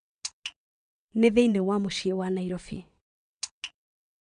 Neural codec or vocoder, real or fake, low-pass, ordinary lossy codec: vocoder, 22.05 kHz, 80 mel bands, WaveNeXt; fake; 9.9 kHz; none